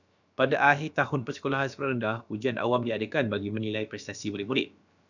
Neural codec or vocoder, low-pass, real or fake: codec, 16 kHz, about 1 kbps, DyCAST, with the encoder's durations; 7.2 kHz; fake